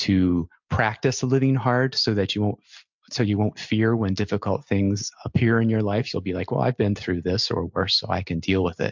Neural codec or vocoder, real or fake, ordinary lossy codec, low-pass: none; real; MP3, 64 kbps; 7.2 kHz